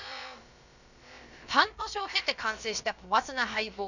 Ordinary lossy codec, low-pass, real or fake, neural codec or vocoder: none; 7.2 kHz; fake; codec, 16 kHz, about 1 kbps, DyCAST, with the encoder's durations